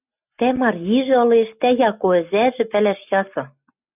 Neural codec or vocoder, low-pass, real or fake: none; 3.6 kHz; real